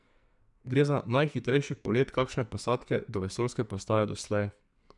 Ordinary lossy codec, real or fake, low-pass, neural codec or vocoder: none; fake; 10.8 kHz; codec, 44.1 kHz, 2.6 kbps, SNAC